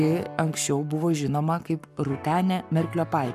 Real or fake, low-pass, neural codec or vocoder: fake; 14.4 kHz; codec, 44.1 kHz, 7.8 kbps, Pupu-Codec